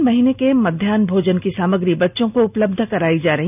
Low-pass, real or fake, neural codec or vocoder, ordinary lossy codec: 3.6 kHz; real; none; none